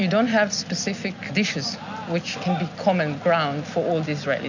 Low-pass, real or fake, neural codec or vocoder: 7.2 kHz; real; none